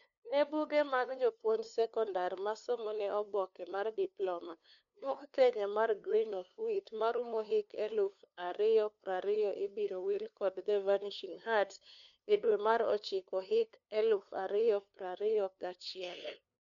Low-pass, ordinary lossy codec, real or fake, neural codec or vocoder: 7.2 kHz; none; fake; codec, 16 kHz, 2 kbps, FunCodec, trained on LibriTTS, 25 frames a second